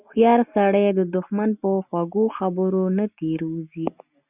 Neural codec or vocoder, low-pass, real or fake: none; 3.6 kHz; real